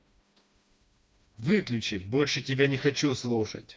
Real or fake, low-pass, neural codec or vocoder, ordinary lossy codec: fake; none; codec, 16 kHz, 2 kbps, FreqCodec, smaller model; none